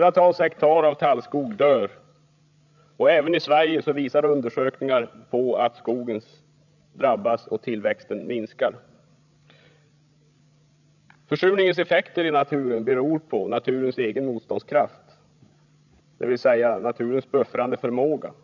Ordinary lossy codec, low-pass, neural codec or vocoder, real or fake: none; 7.2 kHz; codec, 16 kHz, 16 kbps, FreqCodec, larger model; fake